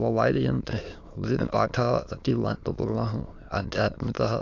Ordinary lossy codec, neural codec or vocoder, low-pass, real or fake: AAC, 48 kbps; autoencoder, 22.05 kHz, a latent of 192 numbers a frame, VITS, trained on many speakers; 7.2 kHz; fake